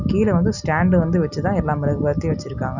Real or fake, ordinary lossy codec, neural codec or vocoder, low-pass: real; none; none; 7.2 kHz